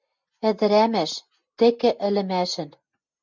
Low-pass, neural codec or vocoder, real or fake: 7.2 kHz; none; real